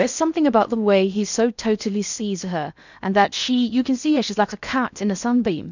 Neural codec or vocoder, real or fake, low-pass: codec, 16 kHz in and 24 kHz out, 0.6 kbps, FocalCodec, streaming, 4096 codes; fake; 7.2 kHz